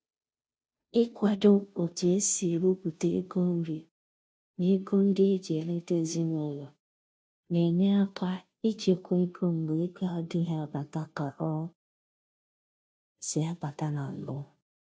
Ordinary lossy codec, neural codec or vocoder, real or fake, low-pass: none; codec, 16 kHz, 0.5 kbps, FunCodec, trained on Chinese and English, 25 frames a second; fake; none